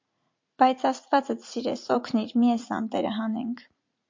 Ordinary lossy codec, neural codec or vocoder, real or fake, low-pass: MP3, 48 kbps; vocoder, 44.1 kHz, 80 mel bands, Vocos; fake; 7.2 kHz